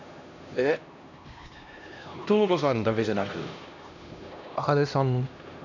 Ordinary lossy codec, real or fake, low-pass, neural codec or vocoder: none; fake; 7.2 kHz; codec, 16 kHz, 1 kbps, X-Codec, HuBERT features, trained on LibriSpeech